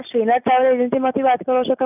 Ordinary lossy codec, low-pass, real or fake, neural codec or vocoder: none; 3.6 kHz; real; none